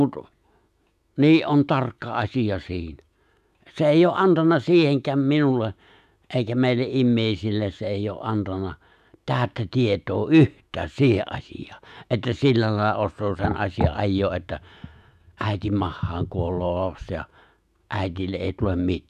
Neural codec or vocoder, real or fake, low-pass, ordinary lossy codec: autoencoder, 48 kHz, 128 numbers a frame, DAC-VAE, trained on Japanese speech; fake; 14.4 kHz; none